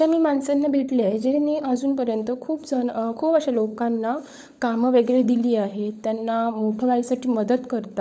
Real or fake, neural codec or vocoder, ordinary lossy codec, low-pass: fake; codec, 16 kHz, 16 kbps, FunCodec, trained on LibriTTS, 50 frames a second; none; none